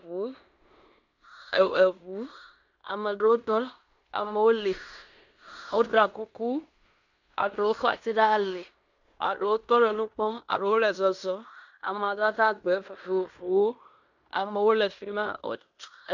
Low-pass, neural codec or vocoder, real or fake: 7.2 kHz; codec, 16 kHz in and 24 kHz out, 0.9 kbps, LongCat-Audio-Codec, fine tuned four codebook decoder; fake